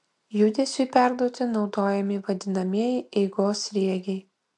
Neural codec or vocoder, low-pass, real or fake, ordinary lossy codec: none; 10.8 kHz; real; AAC, 64 kbps